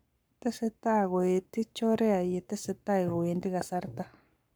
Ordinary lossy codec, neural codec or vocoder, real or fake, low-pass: none; codec, 44.1 kHz, 7.8 kbps, Pupu-Codec; fake; none